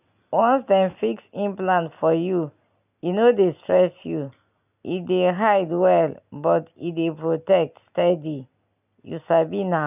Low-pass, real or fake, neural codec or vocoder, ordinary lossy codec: 3.6 kHz; real; none; none